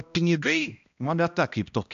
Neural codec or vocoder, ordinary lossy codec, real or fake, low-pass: codec, 16 kHz, 1 kbps, X-Codec, HuBERT features, trained on balanced general audio; AAC, 96 kbps; fake; 7.2 kHz